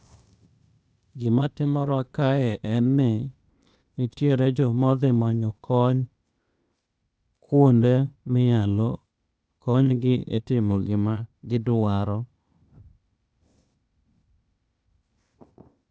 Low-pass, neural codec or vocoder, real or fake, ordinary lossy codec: none; codec, 16 kHz, 0.8 kbps, ZipCodec; fake; none